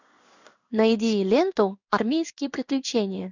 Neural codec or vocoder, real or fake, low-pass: codec, 16 kHz in and 24 kHz out, 1 kbps, XY-Tokenizer; fake; 7.2 kHz